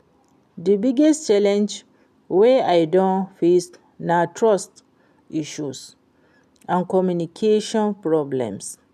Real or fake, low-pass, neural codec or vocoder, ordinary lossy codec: real; 14.4 kHz; none; none